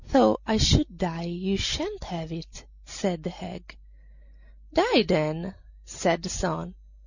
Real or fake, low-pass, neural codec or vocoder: real; 7.2 kHz; none